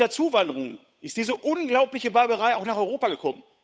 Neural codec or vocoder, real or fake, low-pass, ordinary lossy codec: codec, 16 kHz, 8 kbps, FunCodec, trained on Chinese and English, 25 frames a second; fake; none; none